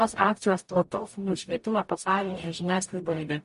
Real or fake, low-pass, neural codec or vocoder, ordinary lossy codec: fake; 14.4 kHz; codec, 44.1 kHz, 0.9 kbps, DAC; MP3, 48 kbps